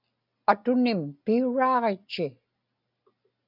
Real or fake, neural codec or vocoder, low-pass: real; none; 5.4 kHz